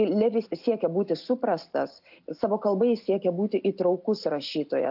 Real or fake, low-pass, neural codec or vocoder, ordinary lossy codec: real; 5.4 kHz; none; AAC, 48 kbps